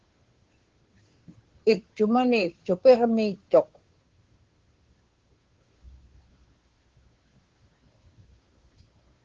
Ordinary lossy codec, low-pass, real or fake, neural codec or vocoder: Opus, 16 kbps; 7.2 kHz; fake; codec, 16 kHz, 2 kbps, FunCodec, trained on Chinese and English, 25 frames a second